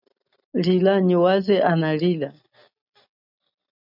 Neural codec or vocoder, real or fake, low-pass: none; real; 5.4 kHz